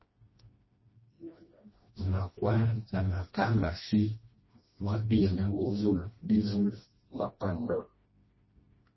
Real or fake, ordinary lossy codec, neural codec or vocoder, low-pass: fake; MP3, 24 kbps; codec, 16 kHz, 1 kbps, FreqCodec, smaller model; 7.2 kHz